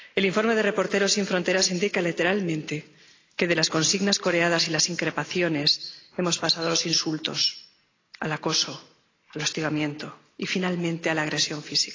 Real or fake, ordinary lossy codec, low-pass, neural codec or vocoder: real; AAC, 32 kbps; 7.2 kHz; none